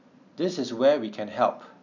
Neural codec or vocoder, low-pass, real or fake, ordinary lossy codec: none; 7.2 kHz; real; none